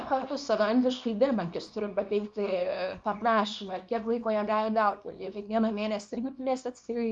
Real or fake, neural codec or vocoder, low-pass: fake; codec, 24 kHz, 0.9 kbps, WavTokenizer, small release; 10.8 kHz